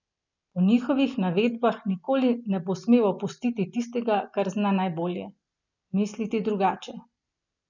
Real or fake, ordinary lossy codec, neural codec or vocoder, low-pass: real; none; none; 7.2 kHz